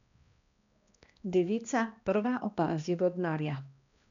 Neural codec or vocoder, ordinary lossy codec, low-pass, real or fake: codec, 16 kHz, 1 kbps, X-Codec, HuBERT features, trained on balanced general audio; none; 7.2 kHz; fake